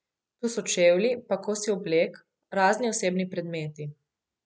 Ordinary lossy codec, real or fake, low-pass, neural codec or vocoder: none; real; none; none